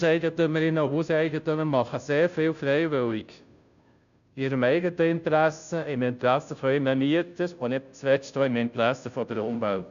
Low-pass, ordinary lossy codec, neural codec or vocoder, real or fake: 7.2 kHz; none; codec, 16 kHz, 0.5 kbps, FunCodec, trained on Chinese and English, 25 frames a second; fake